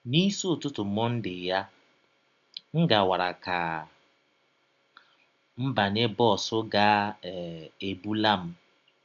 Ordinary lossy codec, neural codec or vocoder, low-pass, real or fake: none; none; 7.2 kHz; real